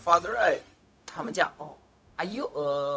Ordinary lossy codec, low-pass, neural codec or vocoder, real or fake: none; none; codec, 16 kHz, 0.4 kbps, LongCat-Audio-Codec; fake